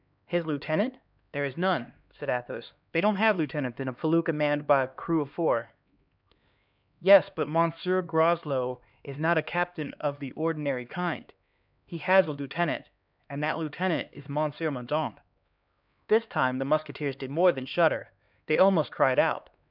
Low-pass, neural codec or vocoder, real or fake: 5.4 kHz; codec, 16 kHz, 2 kbps, X-Codec, HuBERT features, trained on LibriSpeech; fake